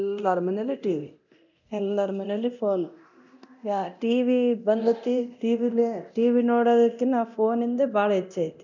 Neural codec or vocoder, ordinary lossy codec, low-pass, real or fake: codec, 24 kHz, 0.9 kbps, DualCodec; none; 7.2 kHz; fake